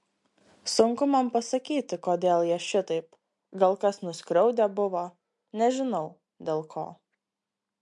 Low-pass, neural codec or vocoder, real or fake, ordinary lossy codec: 10.8 kHz; none; real; MP3, 64 kbps